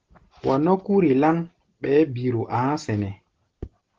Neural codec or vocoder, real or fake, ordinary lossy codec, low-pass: none; real; Opus, 16 kbps; 7.2 kHz